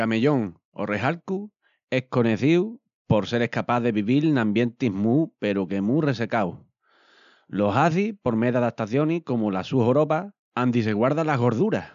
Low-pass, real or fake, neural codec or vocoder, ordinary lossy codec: 7.2 kHz; real; none; none